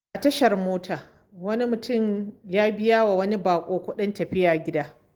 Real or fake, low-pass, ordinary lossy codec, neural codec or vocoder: real; 19.8 kHz; Opus, 24 kbps; none